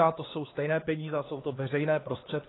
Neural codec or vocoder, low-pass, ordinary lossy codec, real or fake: codec, 16 kHz, 2 kbps, X-Codec, HuBERT features, trained on LibriSpeech; 7.2 kHz; AAC, 16 kbps; fake